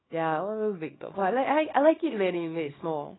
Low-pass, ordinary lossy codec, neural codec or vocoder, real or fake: 7.2 kHz; AAC, 16 kbps; codec, 24 kHz, 0.9 kbps, WavTokenizer, small release; fake